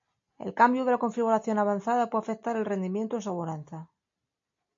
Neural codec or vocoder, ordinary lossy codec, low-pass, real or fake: none; MP3, 48 kbps; 7.2 kHz; real